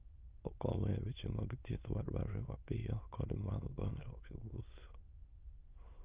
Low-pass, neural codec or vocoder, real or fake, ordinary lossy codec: 3.6 kHz; autoencoder, 22.05 kHz, a latent of 192 numbers a frame, VITS, trained on many speakers; fake; Opus, 24 kbps